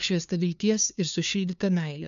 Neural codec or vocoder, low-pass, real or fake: codec, 16 kHz, 2 kbps, FunCodec, trained on LibriTTS, 25 frames a second; 7.2 kHz; fake